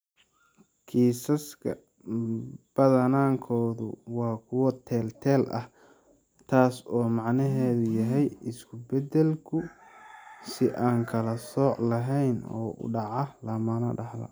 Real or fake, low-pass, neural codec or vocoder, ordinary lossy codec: real; none; none; none